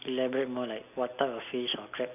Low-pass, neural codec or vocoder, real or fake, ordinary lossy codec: 3.6 kHz; none; real; none